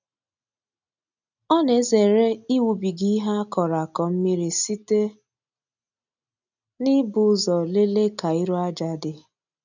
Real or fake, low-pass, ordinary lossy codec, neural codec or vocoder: real; 7.2 kHz; none; none